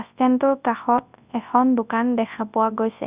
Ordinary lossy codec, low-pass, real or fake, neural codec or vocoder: Opus, 64 kbps; 3.6 kHz; fake; codec, 24 kHz, 0.9 kbps, WavTokenizer, large speech release